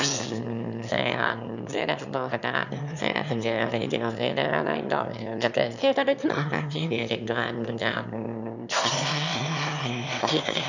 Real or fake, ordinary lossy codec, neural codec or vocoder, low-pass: fake; none; autoencoder, 22.05 kHz, a latent of 192 numbers a frame, VITS, trained on one speaker; 7.2 kHz